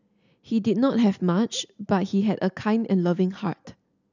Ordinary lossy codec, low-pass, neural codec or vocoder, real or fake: none; 7.2 kHz; none; real